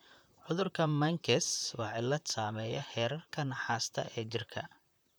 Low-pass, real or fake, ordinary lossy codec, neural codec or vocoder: none; fake; none; vocoder, 44.1 kHz, 128 mel bands, Pupu-Vocoder